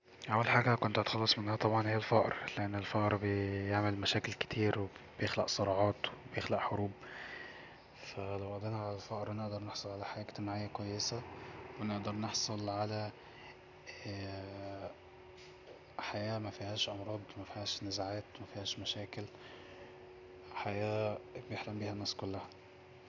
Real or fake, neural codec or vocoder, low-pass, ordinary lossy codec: real; none; 7.2 kHz; none